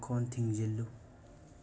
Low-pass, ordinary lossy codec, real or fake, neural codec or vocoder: none; none; real; none